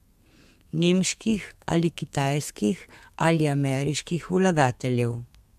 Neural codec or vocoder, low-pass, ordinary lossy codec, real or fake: codec, 32 kHz, 1.9 kbps, SNAC; 14.4 kHz; AAC, 96 kbps; fake